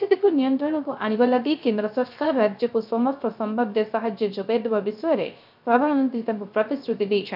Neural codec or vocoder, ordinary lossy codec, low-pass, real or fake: codec, 16 kHz, 0.3 kbps, FocalCodec; none; 5.4 kHz; fake